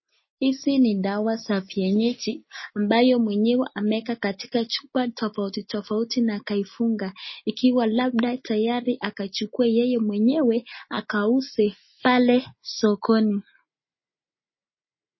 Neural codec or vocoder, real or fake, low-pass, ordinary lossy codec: none; real; 7.2 kHz; MP3, 24 kbps